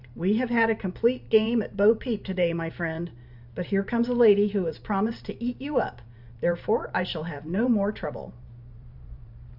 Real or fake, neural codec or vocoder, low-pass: fake; vocoder, 44.1 kHz, 128 mel bands every 512 samples, BigVGAN v2; 5.4 kHz